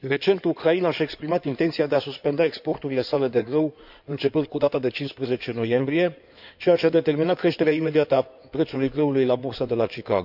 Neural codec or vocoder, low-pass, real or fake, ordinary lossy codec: codec, 16 kHz in and 24 kHz out, 2.2 kbps, FireRedTTS-2 codec; 5.4 kHz; fake; none